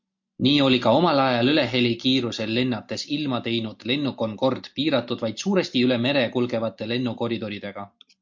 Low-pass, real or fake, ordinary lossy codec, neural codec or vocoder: 7.2 kHz; real; MP3, 48 kbps; none